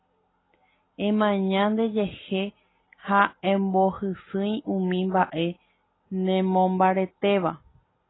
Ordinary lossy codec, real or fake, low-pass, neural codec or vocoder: AAC, 16 kbps; real; 7.2 kHz; none